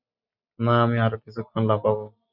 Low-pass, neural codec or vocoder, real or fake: 5.4 kHz; none; real